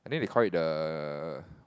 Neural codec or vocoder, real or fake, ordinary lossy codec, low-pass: none; real; none; none